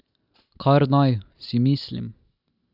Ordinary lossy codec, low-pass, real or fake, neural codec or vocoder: none; 5.4 kHz; real; none